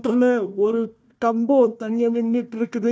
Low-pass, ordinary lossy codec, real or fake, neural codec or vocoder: none; none; fake; codec, 16 kHz, 1 kbps, FunCodec, trained on Chinese and English, 50 frames a second